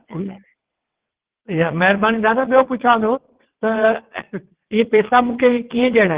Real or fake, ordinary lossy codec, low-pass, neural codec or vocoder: fake; Opus, 16 kbps; 3.6 kHz; vocoder, 22.05 kHz, 80 mel bands, WaveNeXt